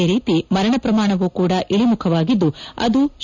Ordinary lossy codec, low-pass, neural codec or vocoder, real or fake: none; 7.2 kHz; none; real